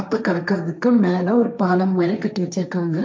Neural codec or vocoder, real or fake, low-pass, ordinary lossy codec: codec, 16 kHz, 1.1 kbps, Voila-Tokenizer; fake; none; none